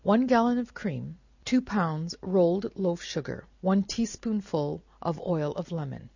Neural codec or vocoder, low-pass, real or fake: none; 7.2 kHz; real